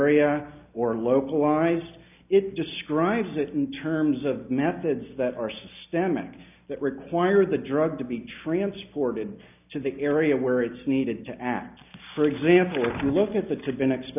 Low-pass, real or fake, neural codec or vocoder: 3.6 kHz; real; none